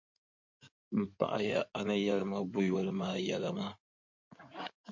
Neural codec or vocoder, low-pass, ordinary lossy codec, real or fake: codec, 16 kHz in and 24 kHz out, 2.2 kbps, FireRedTTS-2 codec; 7.2 kHz; MP3, 48 kbps; fake